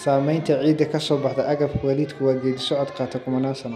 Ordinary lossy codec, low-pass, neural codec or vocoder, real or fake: none; 14.4 kHz; none; real